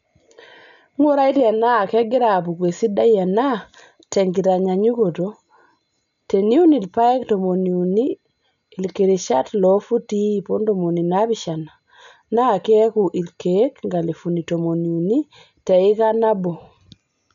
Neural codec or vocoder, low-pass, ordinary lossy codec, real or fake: none; 7.2 kHz; none; real